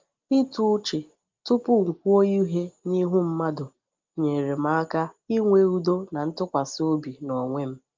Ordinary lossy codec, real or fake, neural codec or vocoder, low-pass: Opus, 24 kbps; real; none; 7.2 kHz